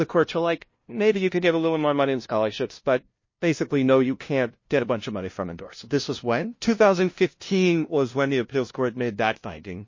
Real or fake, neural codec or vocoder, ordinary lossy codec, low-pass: fake; codec, 16 kHz, 0.5 kbps, FunCodec, trained on LibriTTS, 25 frames a second; MP3, 32 kbps; 7.2 kHz